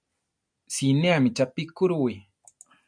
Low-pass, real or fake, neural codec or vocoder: 9.9 kHz; real; none